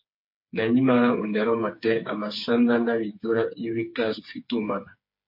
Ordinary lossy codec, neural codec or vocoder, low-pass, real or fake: MP3, 32 kbps; codec, 16 kHz, 4 kbps, FreqCodec, smaller model; 5.4 kHz; fake